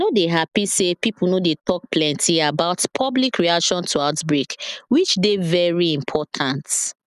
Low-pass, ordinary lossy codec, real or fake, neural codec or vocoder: 14.4 kHz; none; real; none